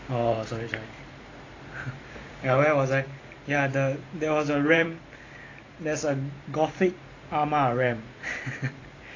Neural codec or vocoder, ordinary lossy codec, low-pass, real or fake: vocoder, 44.1 kHz, 128 mel bands every 512 samples, BigVGAN v2; AAC, 32 kbps; 7.2 kHz; fake